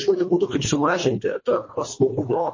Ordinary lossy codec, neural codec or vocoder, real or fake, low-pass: MP3, 32 kbps; codec, 24 kHz, 1.5 kbps, HILCodec; fake; 7.2 kHz